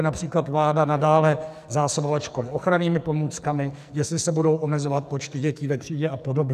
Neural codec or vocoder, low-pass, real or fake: codec, 44.1 kHz, 2.6 kbps, SNAC; 14.4 kHz; fake